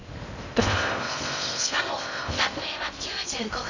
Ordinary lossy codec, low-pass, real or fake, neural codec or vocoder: none; 7.2 kHz; fake; codec, 16 kHz in and 24 kHz out, 0.6 kbps, FocalCodec, streaming, 2048 codes